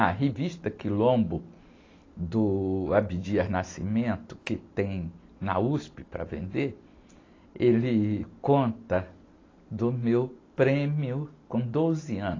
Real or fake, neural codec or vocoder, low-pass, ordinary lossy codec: real; none; 7.2 kHz; AAC, 32 kbps